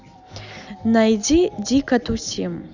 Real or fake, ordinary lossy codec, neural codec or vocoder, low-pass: real; Opus, 64 kbps; none; 7.2 kHz